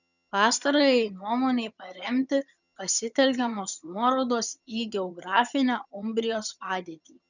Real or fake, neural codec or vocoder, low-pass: fake; vocoder, 22.05 kHz, 80 mel bands, HiFi-GAN; 7.2 kHz